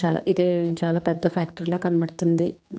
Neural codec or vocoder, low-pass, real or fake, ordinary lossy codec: codec, 16 kHz, 2 kbps, X-Codec, HuBERT features, trained on general audio; none; fake; none